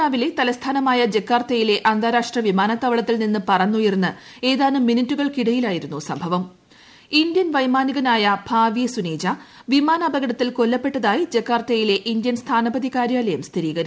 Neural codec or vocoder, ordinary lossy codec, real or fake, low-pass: none; none; real; none